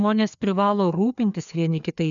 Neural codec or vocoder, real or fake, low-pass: codec, 16 kHz, 2 kbps, FreqCodec, larger model; fake; 7.2 kHz